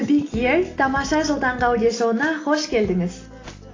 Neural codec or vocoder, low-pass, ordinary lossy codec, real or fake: none; 7.2 kHz; AAC, 32 kbps; real